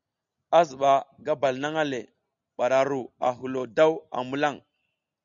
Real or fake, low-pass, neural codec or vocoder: real; 7.2 kHz; none